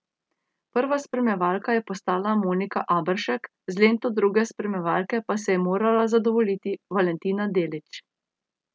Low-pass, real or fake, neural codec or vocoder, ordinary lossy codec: none; real; none; none